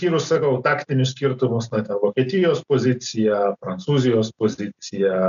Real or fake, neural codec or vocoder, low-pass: real; none; 7.2 kHz